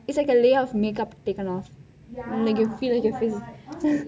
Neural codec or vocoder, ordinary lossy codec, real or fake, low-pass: none; none; real; none